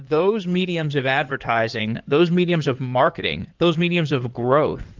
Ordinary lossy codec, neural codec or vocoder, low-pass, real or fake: Opus, 32 kbps; codec, 16 kHz in and 24 kHz out, 2.2 kbps, FireRedTTS-2 codec; 7.2 kHz; fake